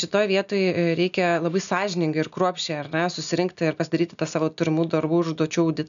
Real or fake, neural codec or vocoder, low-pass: real; none; 7.2 kHz